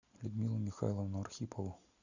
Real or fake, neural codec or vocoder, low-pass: real; none; 7.2 kHz